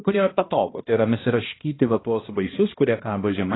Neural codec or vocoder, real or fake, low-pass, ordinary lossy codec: codec, 16 kHz, 1 kbps, X-Codec, HuBERT features, trained on balanced general audio; fake; 7.2 kHz; AAC, 16 kbps